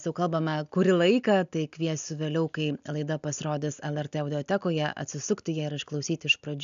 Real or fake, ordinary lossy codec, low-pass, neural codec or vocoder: real; AAC, 96 kbps; 7.2 kHz; none